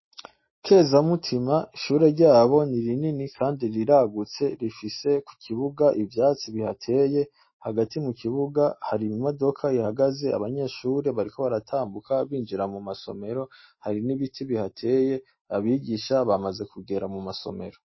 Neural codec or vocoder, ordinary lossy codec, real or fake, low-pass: none; MP3, 24 kbps; real; 7.2 kHz